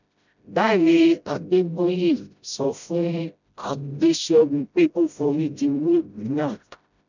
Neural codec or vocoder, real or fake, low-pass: codec, 16 kHz, 0.5 kbps, FreqCodec, smaller model; fake; 7.2 kHz